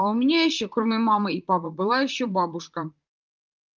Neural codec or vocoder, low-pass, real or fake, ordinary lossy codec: vocoder, 44.1 kHz, 128 mel bands, Pupu-Vocoder; 7.2 kHz; fake; Opus, 32 kbps